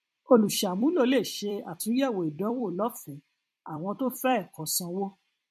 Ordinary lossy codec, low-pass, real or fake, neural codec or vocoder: MP3, 64 kbps; 14.4 kHz; fake; vocoder, 44.1 kHz, 128 mel bands every 256 samples, BigVGAN v2